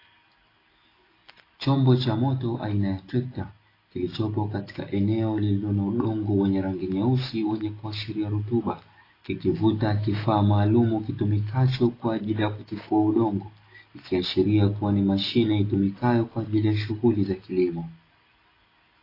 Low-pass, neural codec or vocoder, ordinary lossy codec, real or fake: 5.4 kHz; none; AAC, 24 kbps; real